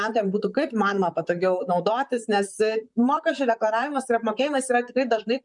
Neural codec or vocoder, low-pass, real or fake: vocoder, 44.1 kHz, 128 mel bands, Pupu-Vocoder; 10.8 kHz; fake